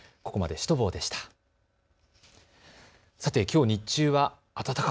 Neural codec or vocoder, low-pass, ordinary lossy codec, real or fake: none; none; none; real